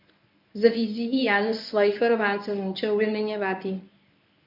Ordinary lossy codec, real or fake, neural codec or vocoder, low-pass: none; fake; codec, 24 kHz, 0.9 kbps, WavTokenizer, medium speech release version 1; 5.4 kHz